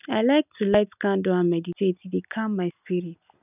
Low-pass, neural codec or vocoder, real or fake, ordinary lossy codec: 3.6 kHz; none; real; none